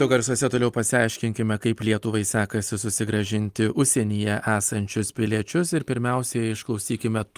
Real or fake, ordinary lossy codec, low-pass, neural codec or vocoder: real; Opus, 32 kbps; 14.4 kHz; none